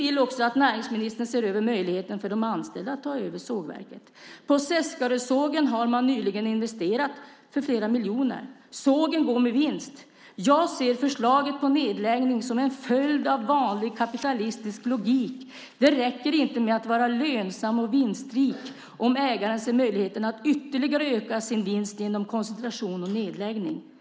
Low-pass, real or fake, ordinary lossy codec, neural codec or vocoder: none; real; none; none